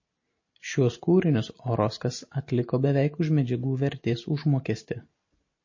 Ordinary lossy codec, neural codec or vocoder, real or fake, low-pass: MP3, 32 kbps; none; real; 7.2 kHz